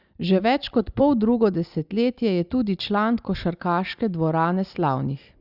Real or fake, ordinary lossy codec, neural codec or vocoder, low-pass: real; Opus, 64 kbps; none; 5.4 kHz